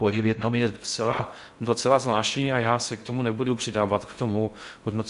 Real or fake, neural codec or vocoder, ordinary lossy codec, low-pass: fake; codec, 16 kHz in and 24 kHz out, 0.6 kbps, FocalCodec, streaming, 4096 codes; MP3, 64 kbps; 10.8 kHz